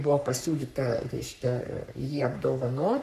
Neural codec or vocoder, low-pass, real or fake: codec, 44.1 kHz, 3.4 kbps, Pupu-Codec; 14.4 kHz; fake